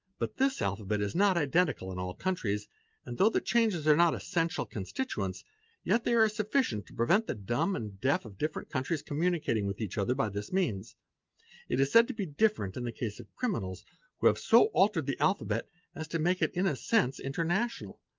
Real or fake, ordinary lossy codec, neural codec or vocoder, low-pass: real; Opus, 24 kbps; none; 7.2 kHz